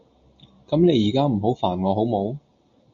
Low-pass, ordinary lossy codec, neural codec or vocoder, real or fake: 7.2 kHz; MP3, 64 kbps; none; real